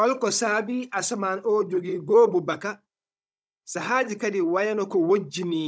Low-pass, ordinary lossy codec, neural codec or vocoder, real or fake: none; none; codec, 16 kHz, 16 kbps, FunCodec, trained on Chinese and English, 50 frames a second; fake